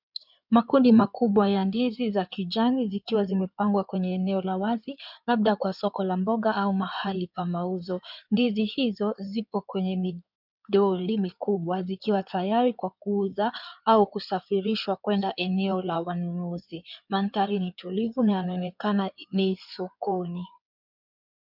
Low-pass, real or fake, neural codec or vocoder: 5.4 kHz; fake; codec, 16 kHz in and 24 kHz out, 2.2 kbps, FireRedTTS-2 codec